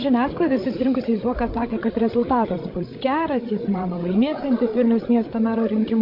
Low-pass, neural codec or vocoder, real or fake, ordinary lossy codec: 5.4 kHz; codec, 16 kHz, 16 kbps, FreqCodec, larger model; fake; MP3, 32 kbps